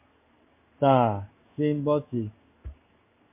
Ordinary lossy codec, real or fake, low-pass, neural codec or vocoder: MP3, 24 kbps; fake; 3.6 kHz; codec, 16 kHz in and 24 kHz out, 1 kbps, XY-Tokenizer